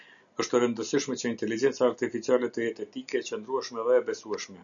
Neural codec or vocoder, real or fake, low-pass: none; real; 7.2 kHz